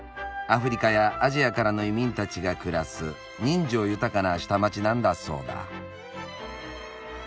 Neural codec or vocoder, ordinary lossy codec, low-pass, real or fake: none; none; none; real